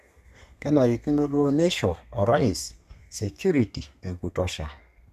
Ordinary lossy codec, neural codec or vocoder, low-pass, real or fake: none; codec, 32 kHz, 1.9 kbps, SNAC; 14.4 kHz; fake